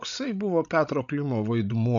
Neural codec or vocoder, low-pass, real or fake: codec, 16 kHz, 16 kbps, FreqCodec, larger model; 7.2 kHz; fake